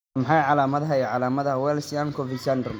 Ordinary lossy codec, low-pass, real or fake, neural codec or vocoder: none; none; real; none